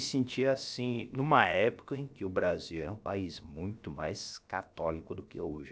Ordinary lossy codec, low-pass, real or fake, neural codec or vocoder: none; none; fake; codec, 16 kHz, about 1 kbps, DyCAST, with the encoder's durations